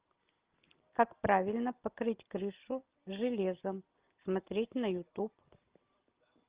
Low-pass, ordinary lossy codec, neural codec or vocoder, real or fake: 3.6 kHz; Opus, 32 kbps; none; real